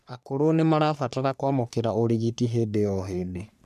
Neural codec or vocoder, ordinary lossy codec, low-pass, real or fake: codec, 44.1 kHz, 3.4 kbps, Pupu-Codec; none; 14.4 kHz; fake